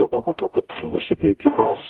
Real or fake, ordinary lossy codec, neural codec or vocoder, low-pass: fake; Opus, 32 kbps; codec, 44.1 kHz, 0.9 kbps, DAC; 19.8 kHz